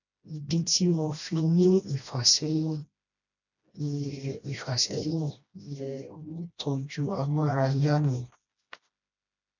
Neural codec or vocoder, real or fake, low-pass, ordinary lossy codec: codec, 16 kHz, 1 kbps, FreqCodec, smaller model; fake; 7.2 kHz; none